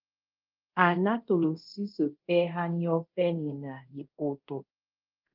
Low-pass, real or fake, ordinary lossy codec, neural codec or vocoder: 5.4 kHz; fake; Opus, 32 kbps; codec, 24 kHz, 0.5 kbps, DualCodec